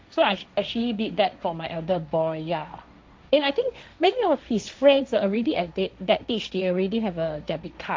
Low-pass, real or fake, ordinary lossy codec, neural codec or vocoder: none; fake; none; codec, 16 kHz, 1.1 kbps, Voila-Tokenizer